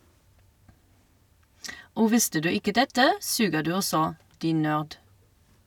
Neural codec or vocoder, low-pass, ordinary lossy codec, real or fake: none; 19.8 kHz; none; real